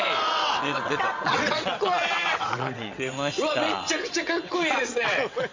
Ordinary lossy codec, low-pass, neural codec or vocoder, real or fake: MP3, 64 kbps; 7.2 kHz; vocoder, 22.05 kHz, 80 mel bands, WaveNeXt; fake